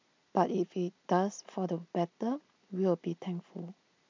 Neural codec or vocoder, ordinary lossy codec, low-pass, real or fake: none; MP3, 64 kbps; 7.2 kHz; real